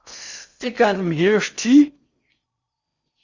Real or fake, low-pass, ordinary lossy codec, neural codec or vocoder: fake; 7.2 kHz; Opus, 64 kbps; codec, 16 kHz in and 24 kHz out, 0.6 kbps, FocalCodec, streaming, 4096 codes